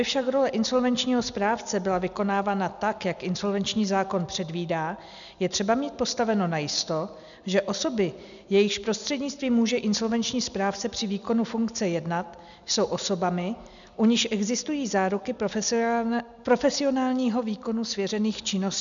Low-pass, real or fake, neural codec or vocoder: 7.2 kHz; real; none